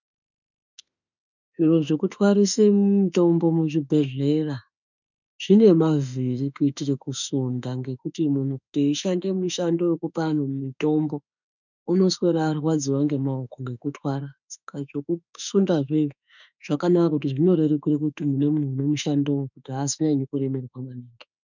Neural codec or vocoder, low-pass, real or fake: autoencoder, 48 kHz, 32 numbers a frame, DAC-VAE, trained on Japanese speech; 7.2 kHz; fake